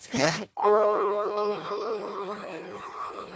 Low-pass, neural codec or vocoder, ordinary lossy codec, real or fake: none; codec, 16 kHz, 1 kbps, FunCodec, trained on Chinese and English, 50 frames a second; none; fake